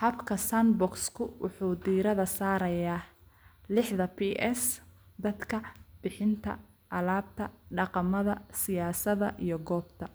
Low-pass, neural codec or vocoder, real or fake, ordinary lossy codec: none; none; real; none